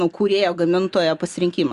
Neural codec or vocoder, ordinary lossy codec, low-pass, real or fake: none; Opus, 64 kbps; 10.8 kHz; real